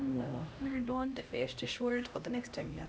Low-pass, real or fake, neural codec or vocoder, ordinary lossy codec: none; fake; codec, 16 kHz, 1 kbps, X-Codec, HuBERT features, trained on LibriSpeech; none